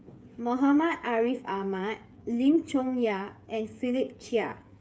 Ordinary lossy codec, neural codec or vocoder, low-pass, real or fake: none; codec, 16 kHz, 8 kbps, FreqCodec, smaller model; none; fake